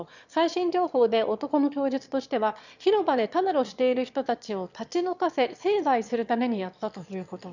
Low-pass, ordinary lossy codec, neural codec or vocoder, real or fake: 7.2 kHz; none; autoencoder, 22.05 kHz, a latent of 192 numbers a frame, VITS, trained on one speaker; fake